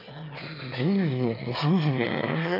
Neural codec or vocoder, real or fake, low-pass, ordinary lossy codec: autoencoder, 22.05 kHz, a latent of 192 numbers a frame, VITS, trained on one speaker; fake; 5.4 kHz; none